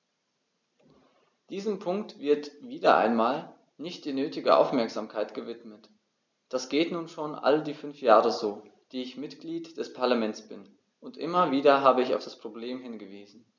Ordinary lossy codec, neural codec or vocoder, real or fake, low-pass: none; none; real; 7.2 kHz